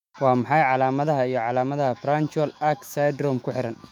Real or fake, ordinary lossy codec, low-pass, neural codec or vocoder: fake; none; 19.8 kHz; autoencoder, 48 kHz, 128 numbers a frame, DAC-VAE, trained on Japanese speech